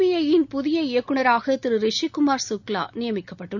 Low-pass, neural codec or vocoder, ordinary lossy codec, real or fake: 7.2 kHz; none; none; real